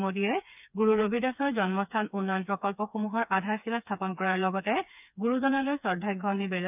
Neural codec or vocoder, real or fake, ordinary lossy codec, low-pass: codec, 16 kHz, 4 kbps, FreqCodec, smaller model; fake; none; 3.6 kHz